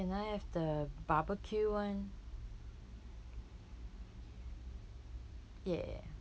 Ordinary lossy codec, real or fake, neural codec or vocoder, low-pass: none; real; none; none